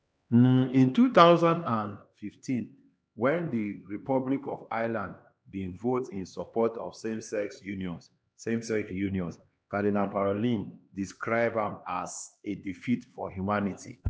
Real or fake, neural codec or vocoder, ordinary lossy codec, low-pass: fake; codec, 16 kHz, 2 kbps, X-Codec, HuBERT features, trained on LibriSpeech; none; none